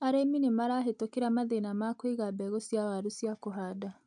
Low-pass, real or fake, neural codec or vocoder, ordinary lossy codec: 9.9 kHz; real; none; none